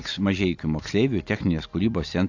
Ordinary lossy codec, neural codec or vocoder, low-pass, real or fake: AAC, 48 kbps; none; 7.2 kHz; real